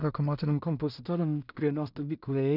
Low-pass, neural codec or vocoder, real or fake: 5.4 kHz; codec, 16 kHz in and 24 kHz out, 0.4 kbps, LongCat-Audio-Codec, two codebook decoder; fake